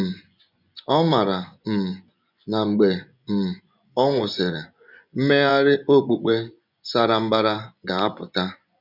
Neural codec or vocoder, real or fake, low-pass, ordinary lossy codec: vocoder, 24 kHz, 100 mel bands, Vocos; fake; 5.4 kHz; none